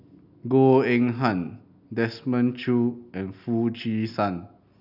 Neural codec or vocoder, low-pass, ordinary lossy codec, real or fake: none; 5.4 kHz; none; real